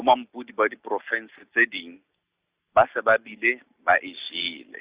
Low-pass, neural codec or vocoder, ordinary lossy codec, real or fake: 3.6 kHz; none; Opus, 64 kbps; real